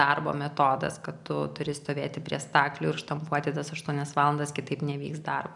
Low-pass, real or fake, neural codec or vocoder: 10.8 kHz; real; none